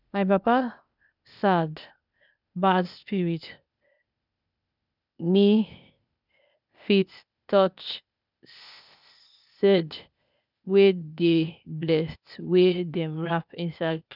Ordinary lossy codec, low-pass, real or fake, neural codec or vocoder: none; 5.4 kHz; fake; codec, 16 kHz, 0.8 kbps, ZipCodec